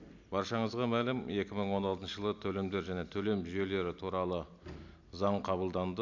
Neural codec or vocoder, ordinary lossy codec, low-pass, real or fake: none; none; 7.2 kHz; real